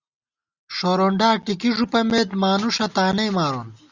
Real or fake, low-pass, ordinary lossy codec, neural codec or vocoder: real; 7.2 kHz; Opus, 64 kbps; none